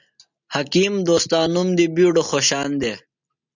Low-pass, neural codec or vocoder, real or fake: 7.2 kHz; none; real